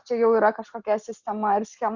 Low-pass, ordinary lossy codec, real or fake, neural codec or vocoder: 7.2 kHz; Opus, 64 kbps; real; none